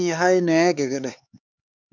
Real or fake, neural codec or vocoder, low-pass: fake; codec, 16 kHz, 4 kbps, X-Codec, HuBERT features, trained on LibriSpeech; 7.2 kHz